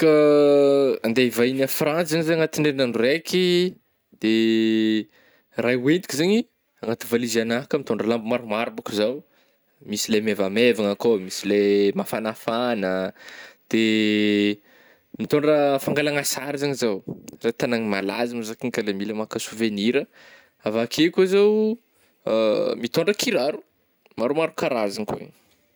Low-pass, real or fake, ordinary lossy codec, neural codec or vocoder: none; real; none; none